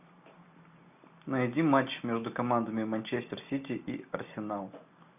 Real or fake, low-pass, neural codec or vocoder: real; 3.6 kHz; none